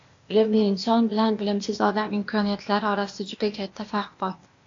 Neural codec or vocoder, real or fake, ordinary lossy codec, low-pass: codec, 16 kHz, 0.8 kbps, ZipCodec; fake; AAC, 48 kbps; 7.2 kHz